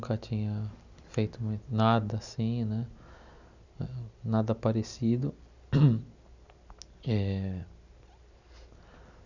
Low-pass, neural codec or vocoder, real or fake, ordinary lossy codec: 7.2 kHz; none; real; none